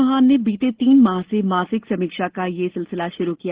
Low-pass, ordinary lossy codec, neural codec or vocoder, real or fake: 3.6 kHz; Opus, 16 kbps; none; real